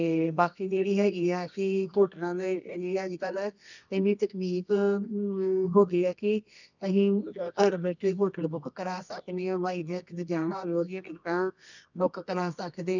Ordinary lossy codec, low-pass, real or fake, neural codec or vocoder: none; 7.2 kHz; fake; codec, 24 kHz, 0.9 kbps, WavTokenizer, medium music audio release